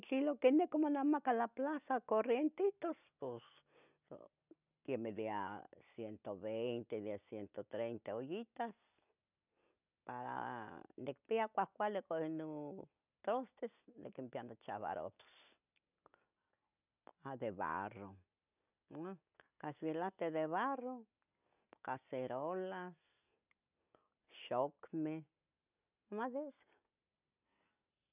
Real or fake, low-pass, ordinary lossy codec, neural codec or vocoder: real; 3.6 kHz; none; none